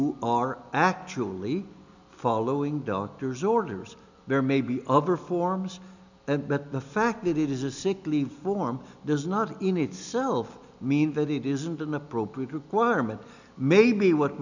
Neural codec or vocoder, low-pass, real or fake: none; 7.2 kHz; real